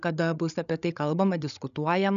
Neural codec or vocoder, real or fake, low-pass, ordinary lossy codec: codec, 16 kHz, 16 kbps, FreqCodec, larger model; fake; 7.2 kHz; MP3, 96 kbps